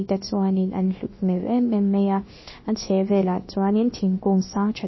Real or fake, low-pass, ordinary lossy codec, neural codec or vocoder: fake; 7.2 kHz; MP3, 24 kbps; codec, 16 kHz, about 1 kbps, DyCAST, with the encoder's durations